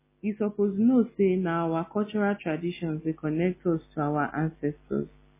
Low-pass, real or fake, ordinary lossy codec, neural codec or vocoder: 3.6 kHz; real; MP3, 16 kbps; none